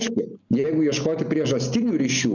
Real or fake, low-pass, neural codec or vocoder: real; 7.2 kHz; none